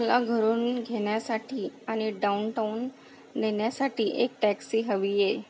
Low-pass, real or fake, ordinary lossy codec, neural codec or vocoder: none; real; none; none